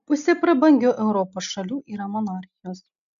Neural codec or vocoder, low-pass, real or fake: none; 7.2 kHz; real